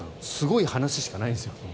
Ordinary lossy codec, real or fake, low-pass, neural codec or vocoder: none; real; none; none